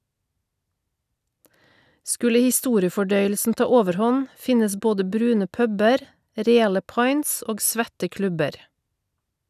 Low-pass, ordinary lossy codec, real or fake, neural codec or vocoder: 14.4 kHz; none; real; none